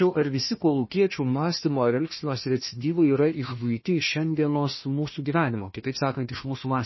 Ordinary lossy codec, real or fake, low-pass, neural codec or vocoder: MP3, 24 kbps; fake; 7.2 kHz; codec, 16 kHz, 1 kbps, FunCodec, trained on Chinese and English, 50 frames a second